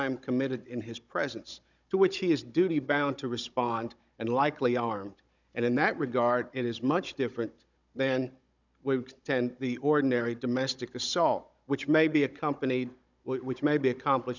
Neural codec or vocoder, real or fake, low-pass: codec, 16 kHz, 16 kbps, FunCodec, trained on Chinese and English, 50 frames a second; fake; 7.2 kHz